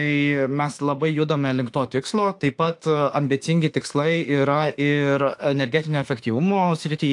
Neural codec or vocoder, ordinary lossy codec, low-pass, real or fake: autoencoder, 48 kHz, 32 numbers a frame, DAC-VAE, trained on Japanese speech; AAC, 64 kbps; 10.8 kHz; fake